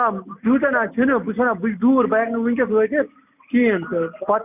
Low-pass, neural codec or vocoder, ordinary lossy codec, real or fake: 3.6 kHz; none; none; real